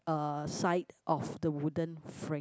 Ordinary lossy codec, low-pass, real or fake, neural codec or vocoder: none; none; real; none